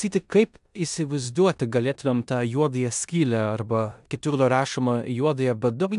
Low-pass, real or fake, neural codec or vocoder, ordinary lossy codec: 10.8 kHz; fake; codec, 16 kHz in and 24 kHz out, 0.9 kbps, LongCat-Audio-Codec, four codebook decoder; AAC, 96 kbps